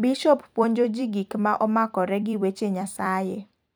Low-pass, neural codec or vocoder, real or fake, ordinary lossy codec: none; vocoder, 44.1 kHz, 128 mel bands every 256 samples, BigVGAN v2; fake; none